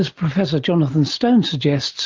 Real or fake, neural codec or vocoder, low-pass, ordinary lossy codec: real; none; 7.2 kHz; Opus, 24 kbps